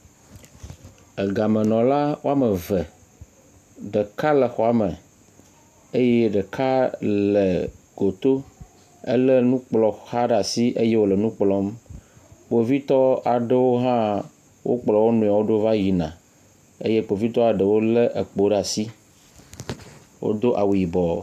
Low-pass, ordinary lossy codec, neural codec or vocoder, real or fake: 14.4 kHz; AAC, 96 kbps; none; real